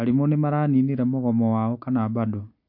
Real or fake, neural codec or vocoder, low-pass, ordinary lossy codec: real; none; 5.4 kHz; MP3, 48 kbps